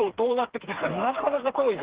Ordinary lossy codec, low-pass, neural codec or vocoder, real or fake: Opus, 16 kbps; 3.6 kHz; codec, 16 kHz, 4 kbps, FreqCodec, smaller model; fake